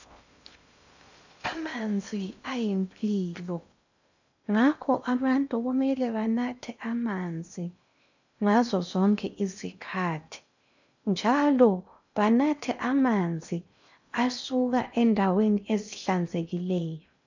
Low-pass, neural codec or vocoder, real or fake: 7.2 kHz; codec, 16 kHz in and 24 kHz out, 0.6 kbps, FocalCodec, streaming, 2048 codes; fake